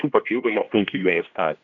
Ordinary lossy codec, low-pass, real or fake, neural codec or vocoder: MP3, 96 kbps; 7.2 kHz; fake; codec, 16 kHz, 1 kbps, X-Codec, HuBERT features, trained on general audio